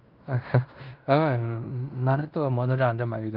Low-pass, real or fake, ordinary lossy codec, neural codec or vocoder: 5.4 kHz; fake; Opus, 32 kbps; codec, 16 kHz in and 24 kHz out, 0.9 kbps, LongCat-Audio-Codec, fine tuned four codebook decoder